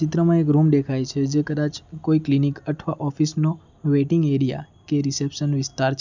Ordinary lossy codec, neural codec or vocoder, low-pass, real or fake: none; none; 7.2 kHz; real